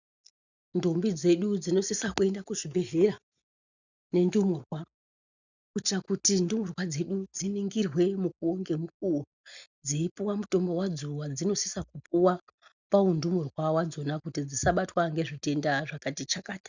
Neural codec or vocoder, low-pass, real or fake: none; 7.2 kHz; real